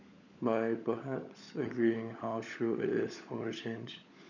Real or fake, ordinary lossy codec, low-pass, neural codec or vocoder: fake; none; 7.2 kHz; codec, 16 kHz, 16 kbps, FunCodec, trained on LibriTTS, 50 frames a second